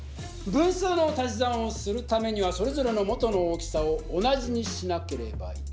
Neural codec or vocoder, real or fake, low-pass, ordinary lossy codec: none; real; none; none